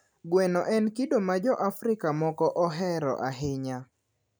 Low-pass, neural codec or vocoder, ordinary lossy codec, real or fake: none; none; none; real